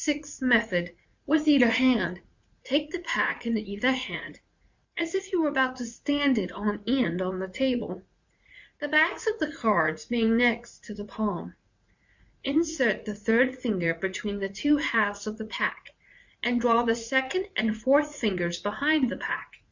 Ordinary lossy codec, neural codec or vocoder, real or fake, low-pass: Opus, 64 kbps; vocoder, 44.1 kHz, 80 mel bands, Vocos; fake; 7.2 kHz